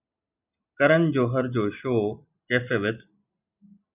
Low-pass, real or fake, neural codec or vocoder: 3.6 kHz; real; none